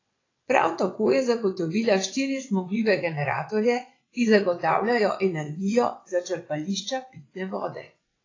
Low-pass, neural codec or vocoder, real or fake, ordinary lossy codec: 7.2 kHz; vocoder, 22.05 kHz, 80 mel bands, WaveNeXt; fake; AAC, 32 kbps